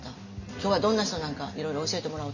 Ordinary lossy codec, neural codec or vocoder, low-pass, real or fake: none; none; 7.2 kHz; real